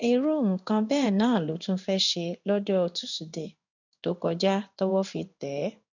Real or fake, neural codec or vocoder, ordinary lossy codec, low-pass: fake; codec, 16 kHz in and 24 kHz out, 1 kbps, XY-Tokenizer; none; 7.2 kHz